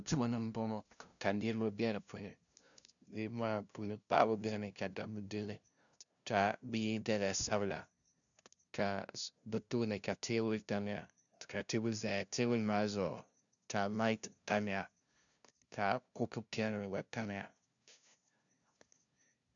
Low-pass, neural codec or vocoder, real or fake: 7.2 kHz; codec, 16 kHz, 0.5 kbps, FunCodec, trained on LibriTTS, 25 frames a second; fake